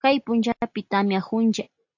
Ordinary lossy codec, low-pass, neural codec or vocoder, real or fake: AAC, 48 kbps; 7.2 kHz; none; real